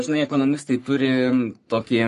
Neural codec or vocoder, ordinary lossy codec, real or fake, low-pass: codec, 44.1 kHz, 3.4 kbps, Pupu-Codec; MP3, 48 kbps; fake; 14.4 kHz